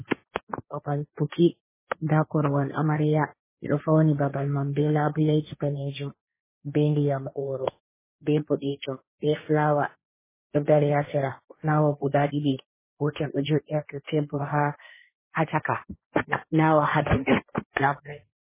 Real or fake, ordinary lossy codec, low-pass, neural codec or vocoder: fake; MP3, 16 kbps; 3.6 kHz; codec, 16 kHz, 1.1 kbps, Voila-Tokenizer